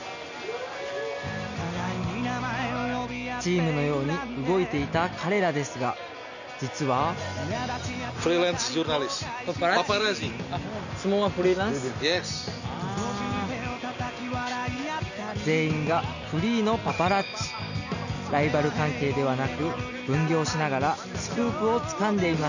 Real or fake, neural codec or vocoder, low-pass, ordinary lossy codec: real; none; 7.2 kHz; none